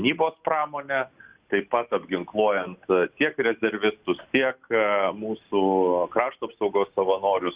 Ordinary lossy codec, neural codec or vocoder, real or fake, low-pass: Opus, 64 kbps; none; real; 3.6 kHz